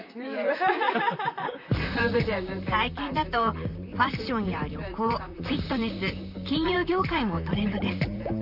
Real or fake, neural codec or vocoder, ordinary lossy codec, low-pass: fake; vocoder, 44.1 kHz, 128 mel bands, Pupu-Vocoder; none; 5.4 kHz